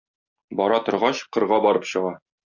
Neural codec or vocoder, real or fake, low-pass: none; real; 7.2 kHz